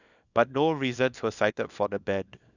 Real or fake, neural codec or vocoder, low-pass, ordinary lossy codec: fake; codec, 24 kHz, 0.9 kbps, WavTokenizer, small release; 7.2 kHz; none